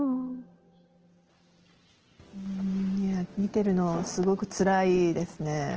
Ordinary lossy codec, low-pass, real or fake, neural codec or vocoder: Opus, 16 kbps; 7.2 kHz; real; none